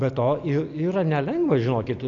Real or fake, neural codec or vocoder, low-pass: real; none; 7.2 kHz